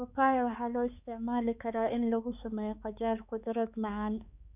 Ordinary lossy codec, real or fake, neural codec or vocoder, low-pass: none; fake; codec, 16 kHz, 4 kbps, X-Codec, HuBERT features, trained on balanced general audio; 3.6 kHz